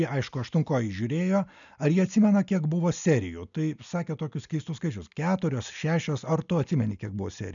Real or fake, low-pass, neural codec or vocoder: real; 7.2 kHz; none